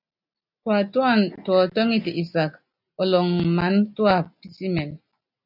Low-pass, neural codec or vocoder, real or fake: 5.4 kHz; none; real